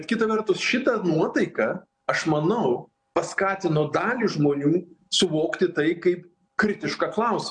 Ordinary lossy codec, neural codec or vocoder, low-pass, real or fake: MP3, 64 kbps; none; 9.9 kHz; real